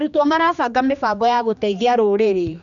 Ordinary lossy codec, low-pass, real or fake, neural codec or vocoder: none; 7.2 kHz; fake; codec, 16 kHz, 2 kbps, X-Codec, HuBERT features, trained on general audio